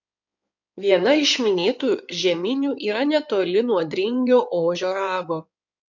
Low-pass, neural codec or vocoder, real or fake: 7.2 kHz; codec, 16 kHz in and 24 kHz out, 2.2 kbps, FireRedTTS-2 codec; fake